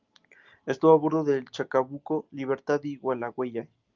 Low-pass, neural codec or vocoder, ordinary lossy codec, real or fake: 7.2 kHz; none; Opus, 32 kbps; real